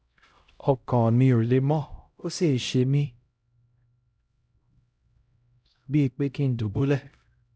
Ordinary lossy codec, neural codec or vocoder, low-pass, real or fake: none; codec, 16 kHz, 0.5 kbps, X-Codec, HuBERT features, trained on LibriSpeech; none; fake